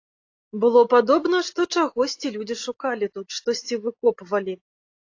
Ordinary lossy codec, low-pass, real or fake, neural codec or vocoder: AAC, 48 kbps; 7.2 kHz; real; none